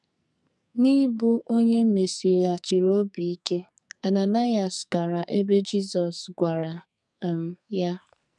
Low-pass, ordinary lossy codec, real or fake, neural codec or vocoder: 10.8 kHz; none; fake; codec, 44.1 kHz, 2.6 kbps, SNAC